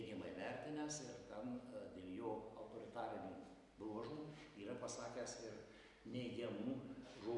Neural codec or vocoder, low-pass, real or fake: none; 10.8 kHz; real